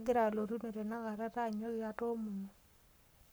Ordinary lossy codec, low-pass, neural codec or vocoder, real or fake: none; none; codec, 44.1 kHz, 7.8 kbps, Pupu-Codec; fake